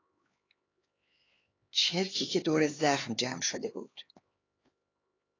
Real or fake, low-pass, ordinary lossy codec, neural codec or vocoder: fake; 7.2 kHz; AAC, 32 kbps; codec, 16 kHz, 4 kbps, X-Codec, HuBERT features, trained on LibriSpeech